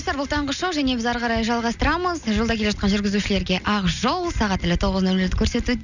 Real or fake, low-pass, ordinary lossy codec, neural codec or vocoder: real; 7.2 kHz; none; none